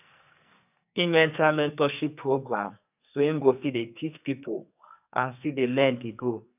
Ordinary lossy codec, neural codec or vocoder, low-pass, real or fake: none; codec, 44.1 kHz, 2.6 kbps, SNAC; 3.6 kHz; fake